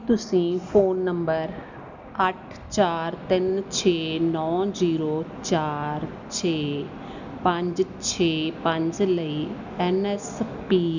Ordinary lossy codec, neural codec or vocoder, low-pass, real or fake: none; none; 7.2 kHz; real